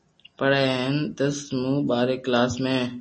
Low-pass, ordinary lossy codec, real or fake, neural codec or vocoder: 9.9 kHz; MP3, 32 kbps; real; none